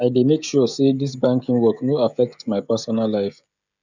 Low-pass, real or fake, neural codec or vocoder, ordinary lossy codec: 7.2 kHz; fake; codec, 16 kHz, 16 kbps, FreqCodec, smaller model; none